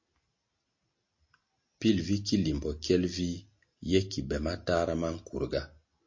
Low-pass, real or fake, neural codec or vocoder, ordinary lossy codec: 7.2 kHz; real; none; MP3, 32 kbps